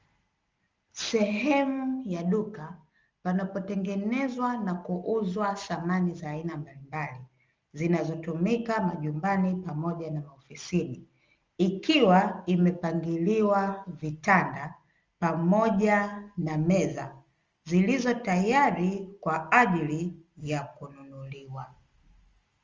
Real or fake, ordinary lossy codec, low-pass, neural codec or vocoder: real; Opus, 32 kbps; 7.2 kHz; none